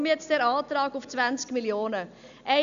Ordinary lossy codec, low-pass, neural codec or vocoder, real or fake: MP3, 96 kbps; 7.2 kHz; none; real